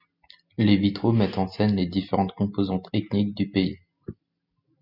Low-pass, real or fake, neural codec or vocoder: 5.4 kHz; real; none